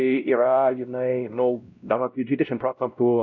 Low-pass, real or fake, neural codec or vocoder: 7.2 kHz; fake; codec, 16 kHz, 0.5 kbps, X-Codec, WavLM features, trained on Multilingual LibriSpeech